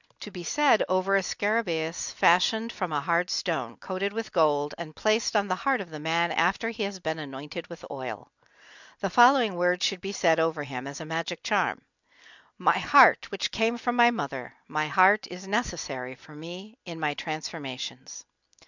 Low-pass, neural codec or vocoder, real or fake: 7.2 kHz; none; real